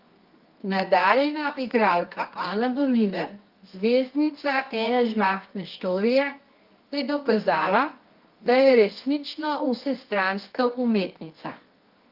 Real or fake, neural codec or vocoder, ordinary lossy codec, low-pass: fake; codec, 24 kHz, 0.9 kbps, WavTokenizer, medium music audio release; Opus, 32 kbps; 5.4 kHz